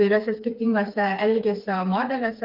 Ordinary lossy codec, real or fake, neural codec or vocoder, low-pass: Opus, 32 kbps; fake; codec, 16 kHz, 4 kbps, FreqCodec, smaller model; 5.4 kHz